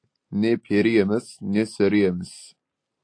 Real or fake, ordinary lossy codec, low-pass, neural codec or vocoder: real; AAC, 48 kbps; 9.9 kHz; none